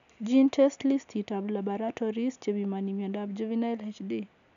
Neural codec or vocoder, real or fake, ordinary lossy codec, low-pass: none; real; none; 7.2 kHz